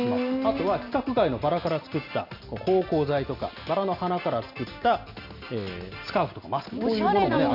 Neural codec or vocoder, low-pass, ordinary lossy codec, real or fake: none; 5.4 kHz; none; real